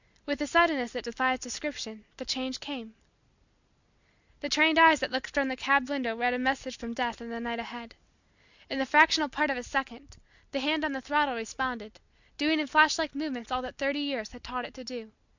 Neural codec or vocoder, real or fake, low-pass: none; real; 7.2 kHz